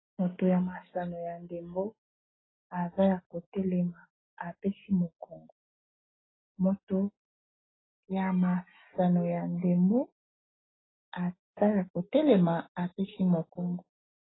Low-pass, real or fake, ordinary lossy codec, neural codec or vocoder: 7.2 kHz; real; AAC, 16 kbps; none